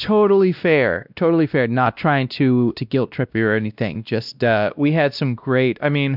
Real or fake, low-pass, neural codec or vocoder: fake; 5.4 kHz; codec, 16 kHz, 1 kbps, X-Codec, WavLM features, trained on Multilingual LibriSpeech